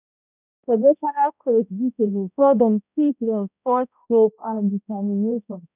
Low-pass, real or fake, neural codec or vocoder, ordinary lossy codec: 3.6 kHz; fake; codec, 16 kHz, 0.5 kbps, X-Codec, HuBERT features, trained on balanced general audio; none